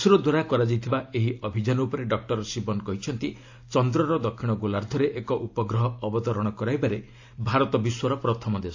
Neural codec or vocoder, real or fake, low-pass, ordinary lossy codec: none; real; 7.2 kHz; AAC, 48 kbps